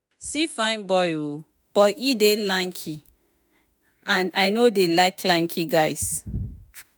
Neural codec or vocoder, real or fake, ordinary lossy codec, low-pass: autoencoder, 48 kHz, 32 numbers a frame, DAC-VAE, trained on Japanese speech; fake; none; none